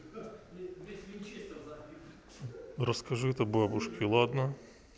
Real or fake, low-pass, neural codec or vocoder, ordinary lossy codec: real; none; none; none